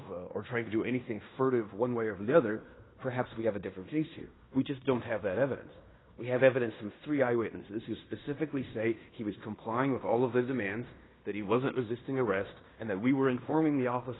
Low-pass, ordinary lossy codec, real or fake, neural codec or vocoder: 7.2 kHz; AAC, 16 kbps; fake; codec, 16 kHz in and 24 kHz out, 0.9 kbps, LongCat-Audio-Codec, fine tuned four codebook decoder